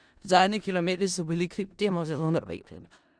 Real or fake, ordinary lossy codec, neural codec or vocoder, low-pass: fake; Opus, 64 kbps; codec, 16 kHz in and 24 kHz out, 0.4 kbps, LongCat-Audio-Codec, four codebook decoder; 9.9 kHz